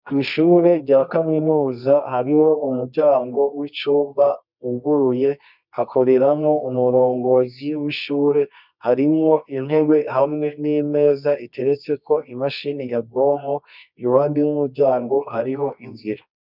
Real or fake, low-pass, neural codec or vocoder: fake; 5.4 kHz; codec, 24 kHz, 0.9 kbps, WavTokenizer, medium music audio release